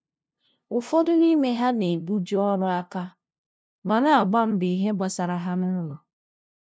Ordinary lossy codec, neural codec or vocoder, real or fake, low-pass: none; codec, 16 kHz, 0.5 kbps, FunCodec, trained on LibriTTS, 25 frames a second; fake; none